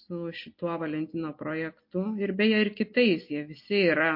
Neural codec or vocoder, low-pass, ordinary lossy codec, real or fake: none; 5.4 kHz; MP3, 32 kbps; real